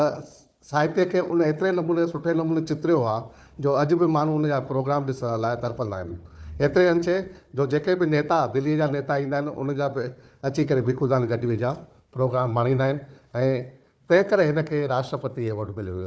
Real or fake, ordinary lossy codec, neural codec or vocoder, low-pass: fake; none; codec, 16 kHz, 4 kbps, FunCodec, trained on Chinese and English, 50 frames a second; none